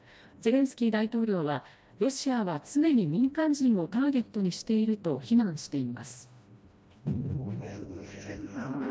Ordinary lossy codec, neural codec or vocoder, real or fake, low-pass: none; codec, 16 kHz, 1 kbps, FreqCodec, smaller model; fake; none